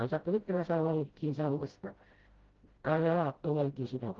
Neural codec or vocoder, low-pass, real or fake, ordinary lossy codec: codec, 16 kHz, 0.5 kbps, FreqCodec, smaller model; 7.2 kHz; fake; Opus, 16 kbps